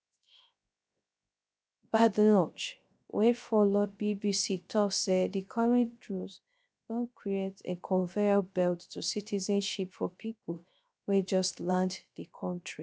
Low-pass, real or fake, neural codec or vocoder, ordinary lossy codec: none; fake; codec, 16 kHz, 0.3 kbps, FocalCodec; none